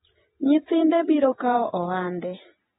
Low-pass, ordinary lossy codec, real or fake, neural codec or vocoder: 19.8 kHz; AAC, 16 kbps; fake; vocoder, 48 kHz, 128 mel bands, Vocos